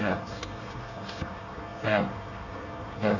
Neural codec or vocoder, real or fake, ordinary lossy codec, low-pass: codec, 24 kHz, 1 kbps, SNAC; fake; none; 7.2 kHz